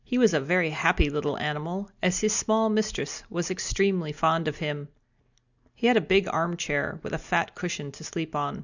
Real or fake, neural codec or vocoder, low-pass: real; none; 7.2 kHz